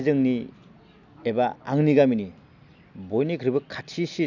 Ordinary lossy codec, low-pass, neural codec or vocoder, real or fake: none; 7.2 kHz; none; real